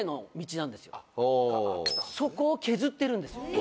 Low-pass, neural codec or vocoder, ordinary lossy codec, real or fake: none; none; none; real